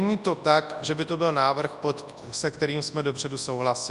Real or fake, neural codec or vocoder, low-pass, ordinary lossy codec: fake; codec, 24 kHz, 0.9 kbps, WavTokenizer, large speech release; 10.8 kHz; Opus, 64 kbps